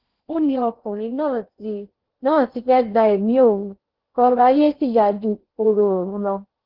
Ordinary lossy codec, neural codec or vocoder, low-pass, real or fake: Opus, 16 kbps; codec, 16 kHz in and 24 kHz out, 0.6 kbps, FocalCodec, streaming, 4096 codes; 5.4 kHz; fake